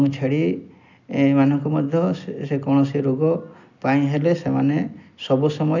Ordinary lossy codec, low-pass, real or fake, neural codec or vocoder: none; 7.2 kHz; real; none